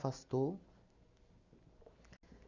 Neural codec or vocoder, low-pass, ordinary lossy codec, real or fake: none; 7.2 kHz; none; real